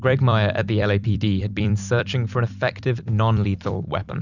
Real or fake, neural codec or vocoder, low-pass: fake; vocoder, 44.1 kHz, 128 mel bands every 256 samples, BigVGAN v2; 7.2 kHz